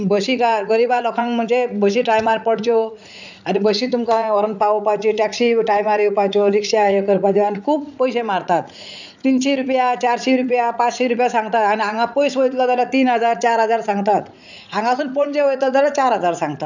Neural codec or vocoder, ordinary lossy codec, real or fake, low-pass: vocoder, 44.1 kHz, 80 mel bands, Vocos; none; fake; 7.2 kHz